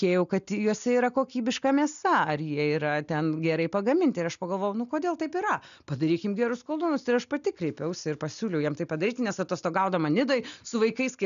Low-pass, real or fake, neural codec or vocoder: 7.2 kHz; real; none